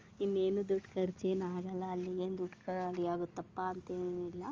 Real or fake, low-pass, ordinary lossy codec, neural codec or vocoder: real; 7.2 kHz; Opus, 32 kbps; none